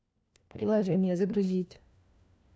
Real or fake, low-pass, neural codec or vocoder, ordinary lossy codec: fake; none; codec, 16 kHz, 1 kbps, FunCodec, trained on LibriTTS, 50 frames a second; none